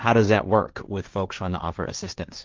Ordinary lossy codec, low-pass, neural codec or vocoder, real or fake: Opus, 32 kbps; 7.2 kHz; codec, 16 kHz, 1.1 kbps, Voila-Tokenizer; fake